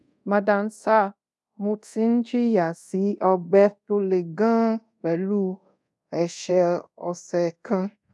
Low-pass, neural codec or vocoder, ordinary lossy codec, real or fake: none; codec, 24 kHz, 0.5 kbps, DualCodec; none; fake